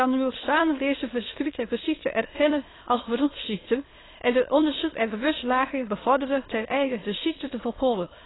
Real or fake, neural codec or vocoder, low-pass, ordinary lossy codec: fake; autoencoder, 22.05 kHz, a latent of 192 numbers a frame, VITS, trained on many speakers; 7.2 kHz; AAC, 16 kbps